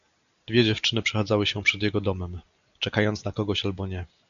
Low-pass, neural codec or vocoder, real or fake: 7.2 kHz; none; real